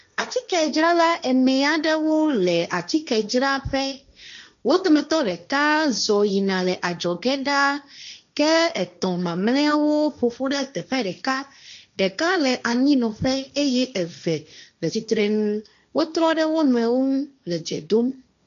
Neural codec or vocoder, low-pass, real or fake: codec, 16 kHz, 1.1 kbps, Voila-Tokenizer; 7.2 kHz; fake